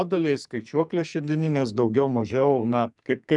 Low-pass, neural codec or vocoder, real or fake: 10.8 kHz; codec, 44.1 kHz, 2.6 kbps, SNAC; fake